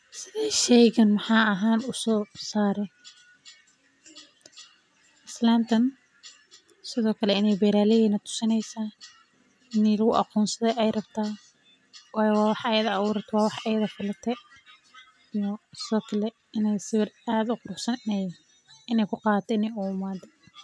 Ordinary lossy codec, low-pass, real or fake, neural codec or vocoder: none; none; real; none